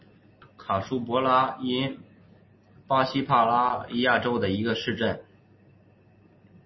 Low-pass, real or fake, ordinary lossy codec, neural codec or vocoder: 7.2 kHz; real; MP3, 24 kbps; none